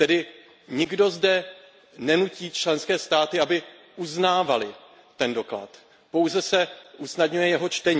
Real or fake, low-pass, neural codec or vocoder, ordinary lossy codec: real; none; none; none